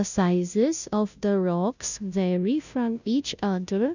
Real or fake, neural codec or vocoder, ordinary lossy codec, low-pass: fake; codec, 16 kHz, 0.5 kbps, FunCodec, trained on Chinese and English, 25 frames a second; none; 7.2 kHz